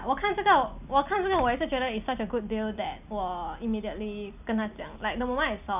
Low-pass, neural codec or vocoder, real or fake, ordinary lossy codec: 3.6 kHz; none; real; none